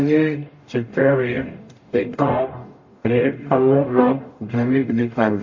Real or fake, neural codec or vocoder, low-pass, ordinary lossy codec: fake; codec, 44.1 kHz, 0.9 kbps, DAC; 7.2 kHz; MP3, 32 kbps